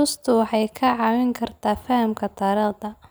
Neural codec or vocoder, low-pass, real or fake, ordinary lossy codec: none; none; real; none